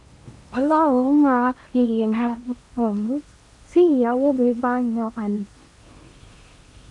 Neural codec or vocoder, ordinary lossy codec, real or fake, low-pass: codec, 16 kHz in and 24 kHz out, 0.8 kbps, FocalCodec, streaming, 65536 codes; MP3, 64 kbps; fake; 10.8 kHz